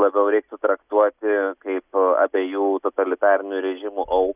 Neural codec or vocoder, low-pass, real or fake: none; 3.6 kHz; real